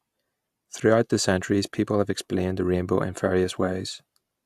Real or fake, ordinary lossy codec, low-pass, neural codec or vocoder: real; AAC, 96 kbps; 14.4 kHz; none